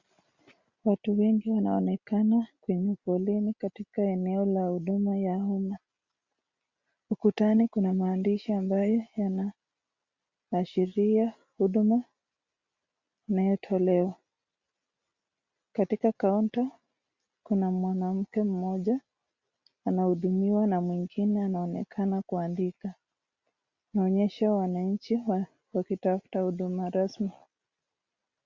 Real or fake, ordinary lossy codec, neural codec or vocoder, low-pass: real; Opus, 64 kbps; none; 7.2 kHz